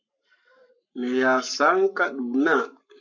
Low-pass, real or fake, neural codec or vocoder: 7.2 kHz; fake; codec, 44.1 kHz, 7.8 kbps, Pupu-Codec